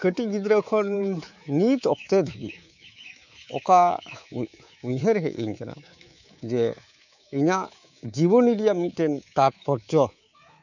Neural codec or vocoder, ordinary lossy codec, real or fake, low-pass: codec, 24 kHz, 3.1 kbps, DualCodec; none; fake; 7.2 kHz